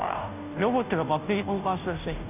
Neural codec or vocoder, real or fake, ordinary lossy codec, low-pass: codec, 16 kHz, 0.5 kbps, FunCodec, trained on Chinese and English, 25 frames a second; fake; none; 3.6 kHz